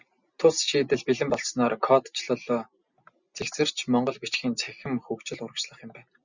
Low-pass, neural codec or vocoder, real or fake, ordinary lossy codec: 7.2 kHz; none; real; Opus, 64 kbps